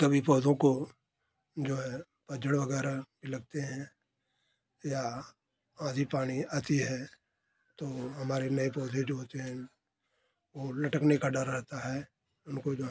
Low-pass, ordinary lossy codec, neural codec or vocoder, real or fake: none; none; none; real